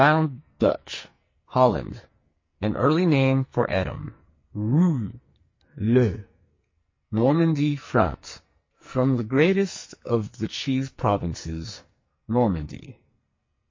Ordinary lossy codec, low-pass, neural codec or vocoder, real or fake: MP3, 32 kbps; 7.2 kHz; codec, 44.1 kHz, 2.6 kbps, SNAC; fake